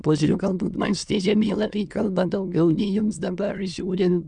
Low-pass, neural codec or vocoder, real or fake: 9.9 kHz; autoencoder, 22.05 kHz, a latent of 192 numbers a frame, VITS, trained on many speakers; fake